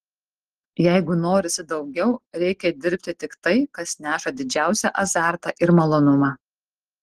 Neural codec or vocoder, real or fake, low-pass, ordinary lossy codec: none; real; 14.4 kHz; Opus, 16 kbps